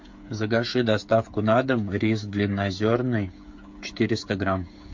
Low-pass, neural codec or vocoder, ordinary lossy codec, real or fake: 7.2 kHz; codec, 16 kHz, 8 kbps, FreqCodec, smaller model; MP3, 48 kbps; fake